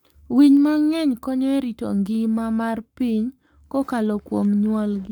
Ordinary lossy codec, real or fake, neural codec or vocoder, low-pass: none; fake; codec, 44.1 kHz, 7.8 kbps, Pupu-Codec; 19.8 kHz